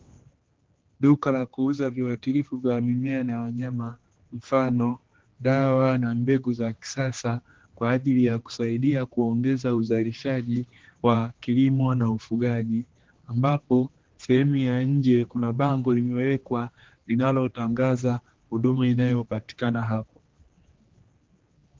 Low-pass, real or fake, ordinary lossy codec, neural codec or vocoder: 7.2 kHz; fake; Opus, 16 kbps; codec, 16 kHz, 2 kbps, X-Codec, HuBERT features, trained on general audio